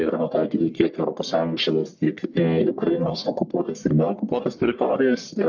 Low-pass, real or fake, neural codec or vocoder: 7.2 kHz; fake; codec, 44.1 kHz, 1.7 kbps, Pupu-Codec